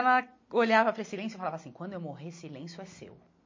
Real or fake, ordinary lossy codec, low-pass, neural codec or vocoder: real; MP3, 32 kbps; 7.2 kHz; none